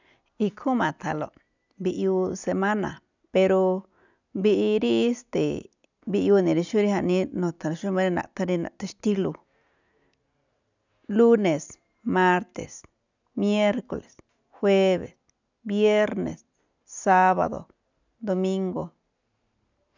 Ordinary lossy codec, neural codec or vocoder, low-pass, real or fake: none; none; 7.2 kHz; real